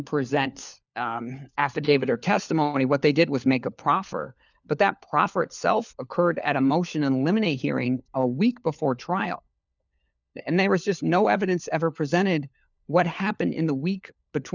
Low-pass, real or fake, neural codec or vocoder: 7.2 kHz; fake; codec, 16 kHz, 4 kbps, FunCodec, trained on LibriTTS, 50 frames a second